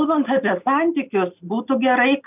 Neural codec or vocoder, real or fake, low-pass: none; real; 3.6 kHz